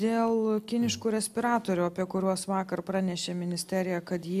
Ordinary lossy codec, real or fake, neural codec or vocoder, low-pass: AAC, 96 kbps; real; none; 14.4 kHz